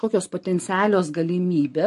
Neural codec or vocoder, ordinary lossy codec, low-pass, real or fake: none; MP3, 48 kbps; 14.4 kHz; real